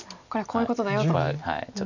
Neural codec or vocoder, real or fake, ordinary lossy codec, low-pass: none; real; none; 7.2 kHz